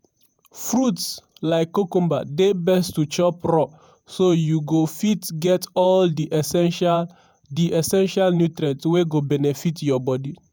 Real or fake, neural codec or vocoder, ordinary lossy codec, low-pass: fake; vocoder, 48 kHz, 128 mel bands, Vocos; none; none